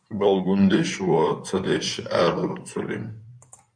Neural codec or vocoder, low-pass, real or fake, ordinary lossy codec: codec, 16 kHz in and 24 kHz out, 2.2 kbps, FireRedTTS-2 codec; 9.9 kHz; fake; MP3, 64 kbps